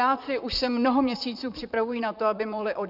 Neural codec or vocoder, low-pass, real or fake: vocoder, 44.1 kHz, 128 mel bands, Pupu-Vocoder; 5.4 kHz; fake